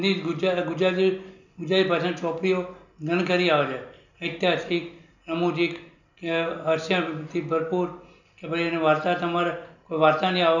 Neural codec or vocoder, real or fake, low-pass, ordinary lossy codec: none; real; 7.2 kHz; none